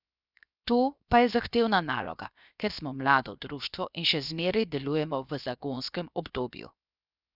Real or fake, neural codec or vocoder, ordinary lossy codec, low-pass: fake; codec, 16 kHz, 0.7 kbps, FocalCodec; none; 5.4 kHz